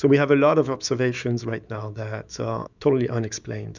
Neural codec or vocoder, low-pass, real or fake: autoencoder, 48 kHz, 128 numbers a frame, DAC-VAE, trained on Japanese speech; 7.2 kHz; fake